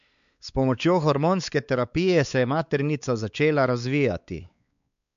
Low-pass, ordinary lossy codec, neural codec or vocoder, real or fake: 7.2 kHz; MP3, 96 kbps; codec, 16 kHz, 4 kbps, X-Codec, WavLM features, trained on Multilingual LibriSpeech; fake